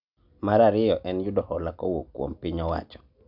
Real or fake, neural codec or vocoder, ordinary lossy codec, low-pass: real; none; none; 5.4 kHz